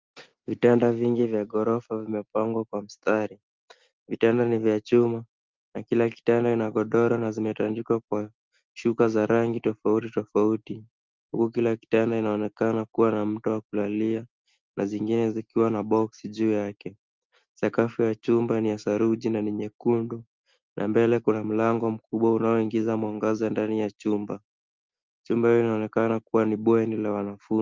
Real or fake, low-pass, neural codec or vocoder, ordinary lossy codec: real; 7.2 kHz; none; Opus, 32 kbps